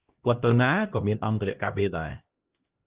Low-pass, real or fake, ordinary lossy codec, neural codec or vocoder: 3.6 kHz; fake; Opus, 16 kbps; codec, 16 kHz, 1 kbps, X-Codec, HuBERT features, trained on LibriSpeech